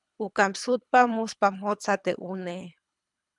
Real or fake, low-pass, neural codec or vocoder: fake; 10.8 kHz; codec, 24 kHz, 3 kbps, HILCodec